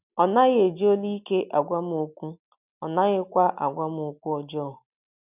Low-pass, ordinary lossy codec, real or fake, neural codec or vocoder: 3.6 kHz; none; real; none